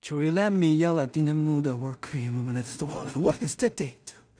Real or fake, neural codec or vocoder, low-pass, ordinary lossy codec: fake; codec, 16 kHz in and 24 kHz out, 0.4 kbps, LongCat-Audio-Codec, two codebook decoder; 9.9 kHz; none